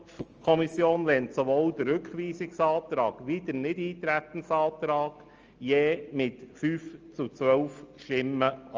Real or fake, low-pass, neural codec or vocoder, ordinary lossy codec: real; 7.2 kHz; none; Opus, 24 kbps